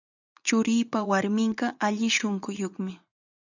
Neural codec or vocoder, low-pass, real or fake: vocoder, 44.1 kHz, 80 mel bands, Vocos; 7.2 kHz; fake